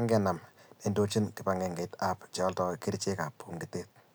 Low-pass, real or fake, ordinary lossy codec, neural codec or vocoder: none; real; none; none